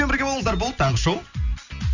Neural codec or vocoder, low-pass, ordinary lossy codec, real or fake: none; 7.2 kHz; none; real